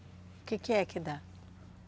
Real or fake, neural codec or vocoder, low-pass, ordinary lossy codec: real; none; none; none